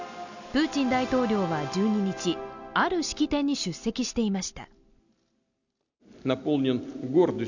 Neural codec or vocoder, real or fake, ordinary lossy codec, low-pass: none; real; none; 7.2 kHz